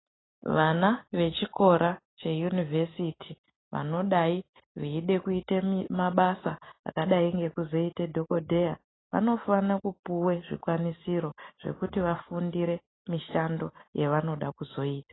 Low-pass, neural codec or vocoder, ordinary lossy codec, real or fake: 7.2 kHz; none; AAC, 16 kbps; real